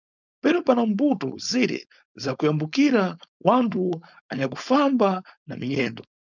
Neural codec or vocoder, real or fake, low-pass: codec, 16 kHz, 4.8 kbps, FACodec; fake; 7.2 kHz